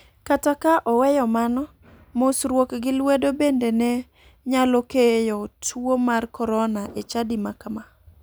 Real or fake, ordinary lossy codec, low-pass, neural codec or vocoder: real; none; none; none